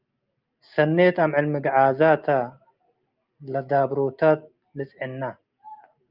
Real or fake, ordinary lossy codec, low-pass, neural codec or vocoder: real; Opus, 32 kbps; 5.4 kHz; none